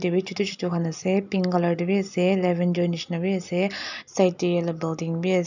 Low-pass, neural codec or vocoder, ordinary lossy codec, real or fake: 7.2 kHz; none; none; real